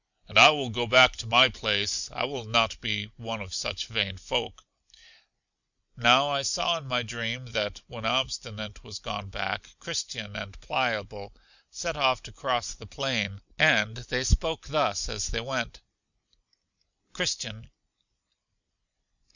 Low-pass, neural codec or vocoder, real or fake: 7.2 kHz; none; real